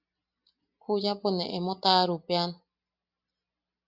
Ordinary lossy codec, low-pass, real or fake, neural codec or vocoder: Opus, 64 kbps; 5.4 kHz; real; none